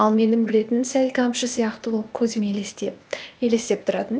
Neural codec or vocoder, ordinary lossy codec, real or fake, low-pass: codec, 16 kHz, 0.8 kbps, ZipCodec; none; fake; none